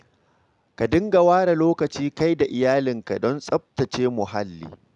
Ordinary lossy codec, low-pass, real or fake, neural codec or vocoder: none; 10.8 kHz; real; none